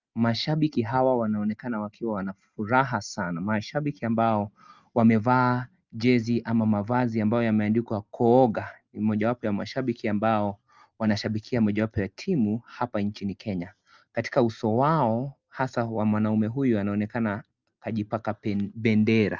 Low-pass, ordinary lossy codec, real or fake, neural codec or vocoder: 7.2 kHz; Opus, 24 kbps; real; none